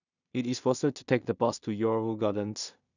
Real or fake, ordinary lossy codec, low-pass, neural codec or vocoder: fake; none; 7.2 kHz; codec, 16 kHz in and 24 kHz out, 0.4 kbps, LongCat-Audio-Codec, two codebook decoder